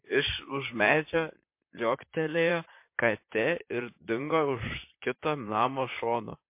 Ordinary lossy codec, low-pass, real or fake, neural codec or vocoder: MP3, 24 kbps; 3.6 kHz; fake; vocoder, 44.1 kHz, 128 mel bands, Pupu-Vocoder